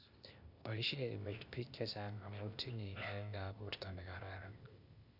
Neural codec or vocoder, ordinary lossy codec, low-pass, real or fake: codec, 16 kHz, 0.8 kbps, ZipCodec; none; 5.4 kHz; fake